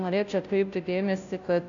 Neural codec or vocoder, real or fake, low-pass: codec, 16 kHz, 0.5 kbps, FunCodec, trained on Chinese and English, 25 frames a second; fake; 7.2 kHz